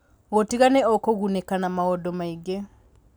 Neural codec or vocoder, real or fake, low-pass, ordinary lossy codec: none; real; none; none